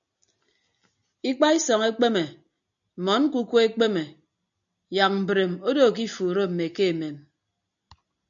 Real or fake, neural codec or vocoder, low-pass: real; none; 7.2 kHz